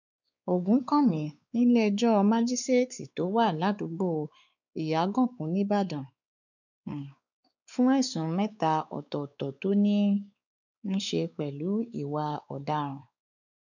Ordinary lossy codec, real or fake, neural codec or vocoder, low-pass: none; fake; codec, 16 kHz, 4 kbps, X-Codec, WavLM features, trained on Multilingual LibriSpeech; 7.2 kHz